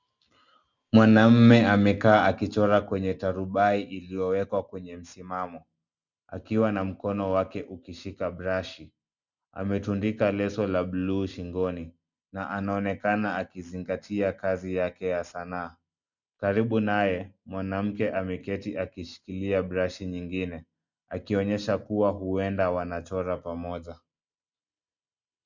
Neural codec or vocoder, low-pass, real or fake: none; 7.2 kHz; real